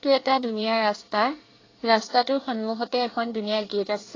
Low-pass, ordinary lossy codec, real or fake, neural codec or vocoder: 7.2 kHz; AAC, 32 kbps; fake; codec, 24 kHz, 1 kbps, SNAC